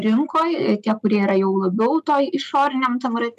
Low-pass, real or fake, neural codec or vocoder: 14.4 kHz; real; none